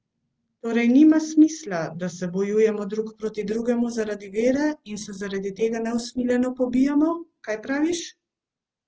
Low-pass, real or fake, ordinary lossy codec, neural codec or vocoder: 7.2 kHz; real; Opus, 16 kbps; none